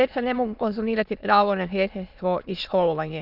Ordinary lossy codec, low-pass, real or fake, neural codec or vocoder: none; 5.4 kHz; fake; autoencoder, 22.05 kHz, a latent of 192 numbers a frame, VITS, trained on many speakers